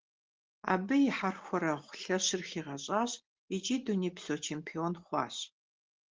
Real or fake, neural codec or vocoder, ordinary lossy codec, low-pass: real; none; Opus, 16 kbps; 7.2 kHz